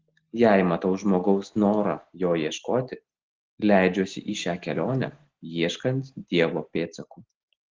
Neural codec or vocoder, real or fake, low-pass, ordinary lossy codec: none; real; 7.2 kHz; Opus, 16 kbps